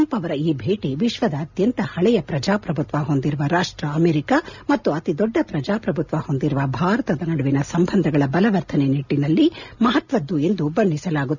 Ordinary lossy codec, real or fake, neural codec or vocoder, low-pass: none; real; none; 7.2 kHz